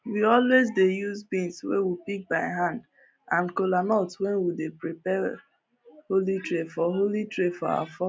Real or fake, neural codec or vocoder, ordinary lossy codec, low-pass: real; none; none; none